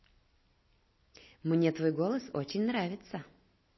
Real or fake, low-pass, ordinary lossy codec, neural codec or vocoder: real; 7.2 kHz; MP3, 24 kbps; none